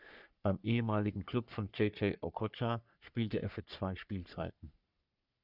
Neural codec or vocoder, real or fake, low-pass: codec, 44.1 kHz, 3.4 kbps, Pupu-Codec; fake; 5.4 kHz